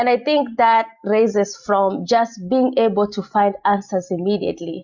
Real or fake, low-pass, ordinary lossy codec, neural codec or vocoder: real; 7.2 kHz; Opus, 64 kbps; none